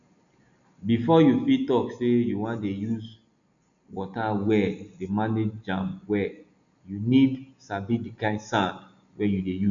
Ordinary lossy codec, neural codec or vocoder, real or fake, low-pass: none; none; real; 7.2 kHz